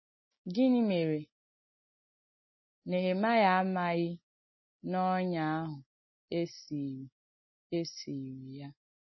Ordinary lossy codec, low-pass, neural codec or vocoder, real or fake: MP3, 24 kbps; 7.2 kHz; none; real